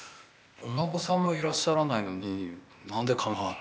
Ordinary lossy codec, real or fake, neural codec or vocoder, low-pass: none; fake; codec, 16 kHz, 0.8 kbps, ZipCodec; none